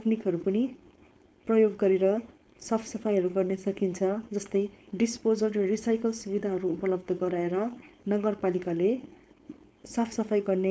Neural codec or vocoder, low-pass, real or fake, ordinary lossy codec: codec, 16 kHz, 4.8 kbps, FACodec; none; fake; none